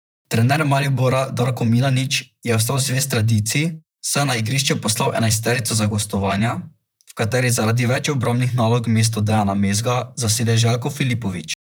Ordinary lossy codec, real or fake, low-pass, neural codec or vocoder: none; fake; none; vocoder, 44.1 kHz, 128 mel bands, Pupu-Vocoder